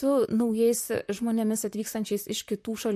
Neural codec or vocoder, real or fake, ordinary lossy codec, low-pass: vocoder, 44.1 kHz, 128 mel bands, Pupu-Vocoder; fake; MP3, 64 kbps; 14.4 kHz